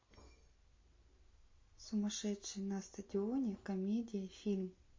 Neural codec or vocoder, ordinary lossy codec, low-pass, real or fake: none; MP3, 32 kbps; 7.2 kHz; real